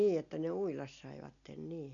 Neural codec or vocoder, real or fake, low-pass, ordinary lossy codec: none; real; 7.2 kHz; none